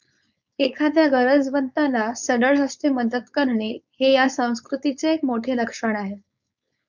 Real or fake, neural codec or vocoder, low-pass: fake; codec, 16 kHz, 4.8 kbps, FACodec; 7.2 kHz